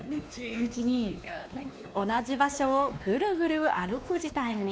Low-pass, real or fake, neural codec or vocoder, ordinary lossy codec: none; fake; codec, 16 kHz, 2 kbps, X-Codec, WavLM features, trained on Multilingual LibriSpeech; none